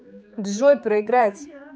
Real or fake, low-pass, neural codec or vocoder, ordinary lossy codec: fake; none; codec, 16 kHz, 4 kbps, X-Codec, HuBERT features, trained on general audio; none